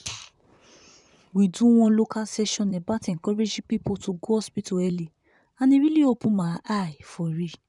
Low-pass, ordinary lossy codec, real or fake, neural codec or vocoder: 10.8 kHz; none; fake; vocoder, 44.1 kHz, 128 mel bands, Pupu-Vocoder